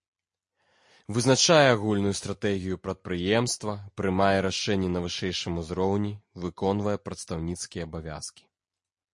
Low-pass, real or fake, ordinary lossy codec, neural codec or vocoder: 10.8 kHz; real; MP3, 48 kbps; none